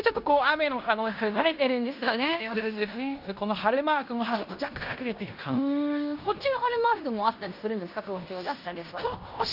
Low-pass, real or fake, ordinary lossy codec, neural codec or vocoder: 5.4 kHz; fake; none; codec, 16 kHz in and 24 kHz out, 0.9 kbps, LongCat-Audio-Codec, fine tuned four codebook decoder